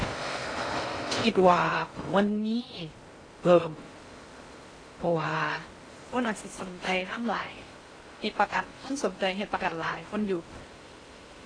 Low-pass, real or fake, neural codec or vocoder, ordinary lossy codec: 9.9 kHz; fake; codec, 16 kHz in and 24 kHz out, 0.6 kbps, FocalCodec, streaming, 4096 codes; AAC, 32 kbps